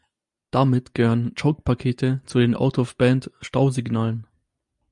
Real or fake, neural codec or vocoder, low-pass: real; none; 10.8 kHz